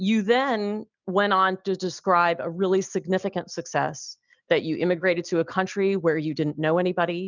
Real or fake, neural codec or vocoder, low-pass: real; none; 7.2 kHz